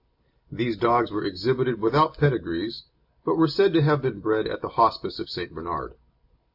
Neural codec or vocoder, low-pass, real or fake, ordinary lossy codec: none; 5.4 kHz; real; AAC, 48 kbps